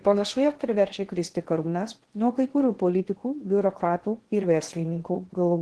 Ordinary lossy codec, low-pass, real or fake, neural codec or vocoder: Opus, 16 kbps; 10.8 kHz; fake; codec, 16 kHz in and 24 kHz out, 0.6 kbps, FocalCodec, streaming, 2048 codes